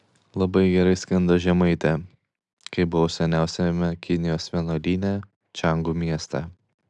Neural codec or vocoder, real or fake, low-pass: none; real; 10.8 kHz